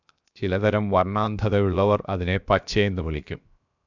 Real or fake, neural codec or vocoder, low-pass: fake; codec, 16 kHz, 0.7 kbps, FocalCodec; 7.2 kHz